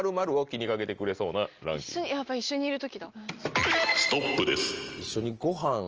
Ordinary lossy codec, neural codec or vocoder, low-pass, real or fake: Opus, 24 kbps; none; 7.2 kHz; real